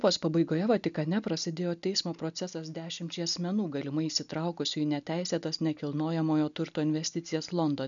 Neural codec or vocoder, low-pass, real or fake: none; 7.2 kHz; real